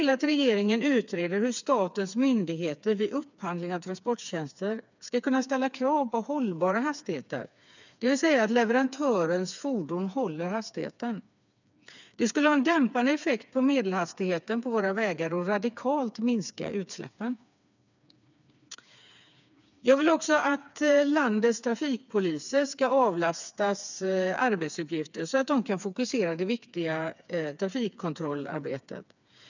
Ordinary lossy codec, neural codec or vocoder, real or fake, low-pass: none; codec, 16 kHz, 4 kbps, FreqCodec, smaller model; fake; 7.2 kHz